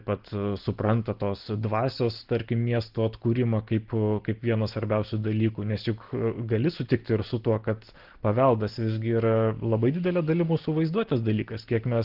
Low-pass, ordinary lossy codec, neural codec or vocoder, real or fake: 5.4 kHz; Opus, 16 kbps; none; real